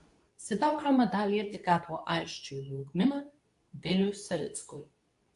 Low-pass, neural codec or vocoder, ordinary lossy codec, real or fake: 10.8 kHz; codec, 24 kHz, 0.9 kbps, WavTokenizer, medium speech release version 2; AAC, 64 kbps; fake